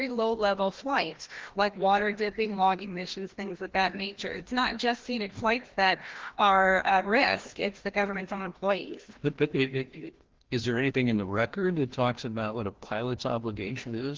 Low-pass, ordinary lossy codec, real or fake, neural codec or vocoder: 7.2 kHz; Opus, 16 kbps; fake; codec, 16 kHz, 1 kbps, FreqCodec, larger model